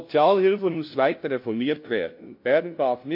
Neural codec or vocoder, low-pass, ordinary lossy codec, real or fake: codec, 16 kHz, 0.5 kbps, FunCodec, trained on LibriTTS, 25 frames a second; 5.4 kHz; MP3, 32 kbps; fake